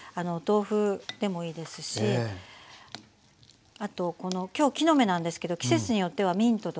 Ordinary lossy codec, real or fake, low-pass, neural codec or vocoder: none; real; none; none